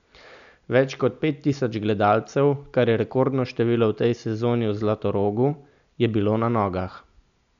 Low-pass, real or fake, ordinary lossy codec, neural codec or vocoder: 7.2 kHz; real; none; none